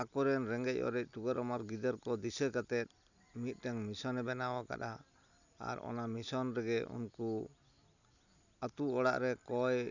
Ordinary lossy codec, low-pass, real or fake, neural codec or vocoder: none; 7.2 kHz; real; none